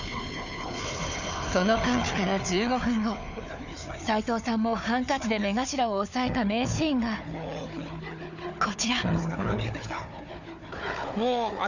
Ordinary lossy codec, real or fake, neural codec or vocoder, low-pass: none; fake; codec, 16 kHz, 4 kbps, FunCodec, trained on Chinese and English, 50 frames a second; 7.2 kHz